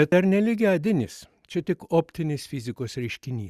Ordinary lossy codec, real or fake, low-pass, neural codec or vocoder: Opus, 64 kbps; real; 14.4 kHz; none